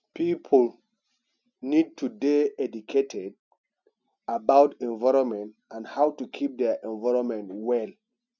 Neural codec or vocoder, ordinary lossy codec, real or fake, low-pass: none; none; real; 7.2 kHz